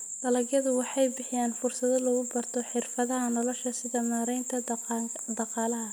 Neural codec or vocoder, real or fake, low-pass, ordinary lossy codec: none; real; none; none